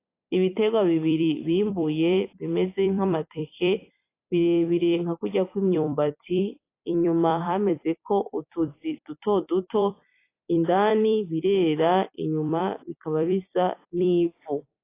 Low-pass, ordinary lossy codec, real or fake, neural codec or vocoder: 3.6 kHz; AAC, 24 kbps; fake; vocoder, 44.1 kHz, 128 mel bands every 256 samples, BigVGAN v2